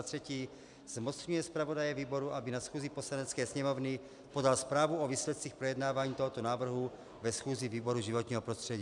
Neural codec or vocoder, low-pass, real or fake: none; 10.8 kHz; real